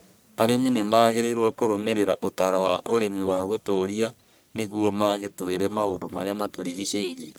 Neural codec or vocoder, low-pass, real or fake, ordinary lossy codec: codec, 44.1 kHz, 1.7 kbps, Pupu-Codec; none; fake; none